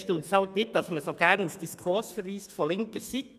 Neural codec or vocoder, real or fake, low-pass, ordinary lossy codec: codec, 32 kHz, 1.9 kbps, SNAC; fake; 14.4 kHz; none